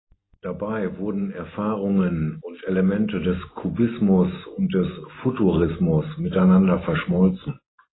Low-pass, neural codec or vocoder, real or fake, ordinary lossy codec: 7.2 kHz; none; real; AAC, 16 kbps